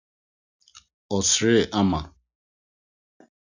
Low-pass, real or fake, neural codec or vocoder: 7.2 kHz; real; none